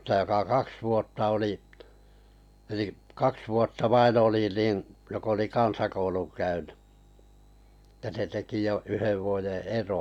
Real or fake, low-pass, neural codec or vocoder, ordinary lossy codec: real; 19.8 kHz; none; none